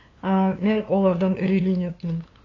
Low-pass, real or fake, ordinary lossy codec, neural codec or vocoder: 7.2 kHz; fake; AAC, 32 kbps; codec, 16 kHz, 2 kbps, FunCodec, trained on LibriTTS, 25 frames a second